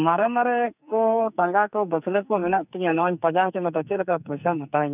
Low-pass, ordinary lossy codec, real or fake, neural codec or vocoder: 3.6 kHz; none; fake; codec, 44.1 kHz, 2.6 kbps, SNAC